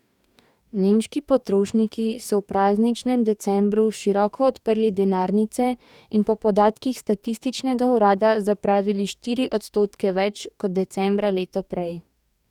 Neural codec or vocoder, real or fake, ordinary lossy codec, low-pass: codec, 44.1 kHz, 2.6 kbps, DAC; fake; none; 19.8 kHz